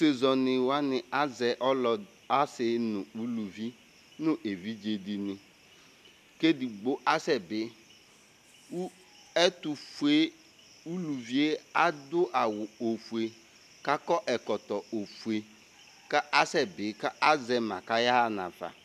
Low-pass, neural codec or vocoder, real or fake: 14.4 kHz; none; real